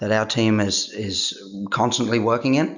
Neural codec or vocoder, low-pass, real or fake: none; 7.2 kHz; real